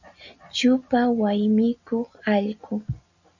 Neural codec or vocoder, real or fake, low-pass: none; real; 7.2 kHz